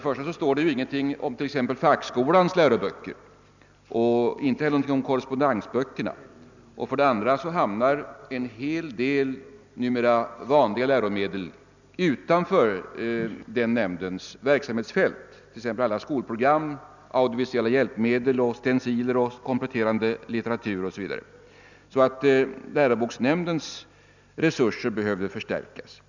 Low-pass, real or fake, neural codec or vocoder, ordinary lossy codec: 7.2 kHz; real; none; none